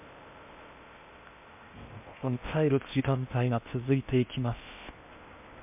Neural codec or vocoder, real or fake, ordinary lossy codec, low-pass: codec, 16 kHz in and 24 kHz out, 0.6 kbps, FocalCodec, streaming, 2048 codes; fake; MP3, 32 kbps; 3.6 kHz